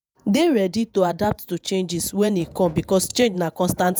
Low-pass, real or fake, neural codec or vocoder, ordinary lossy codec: none; fake; vocoder, 48 kHz, 128 mel bands, Vocos; none